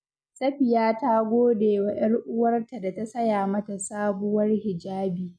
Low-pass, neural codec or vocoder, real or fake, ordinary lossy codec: none; none; real; none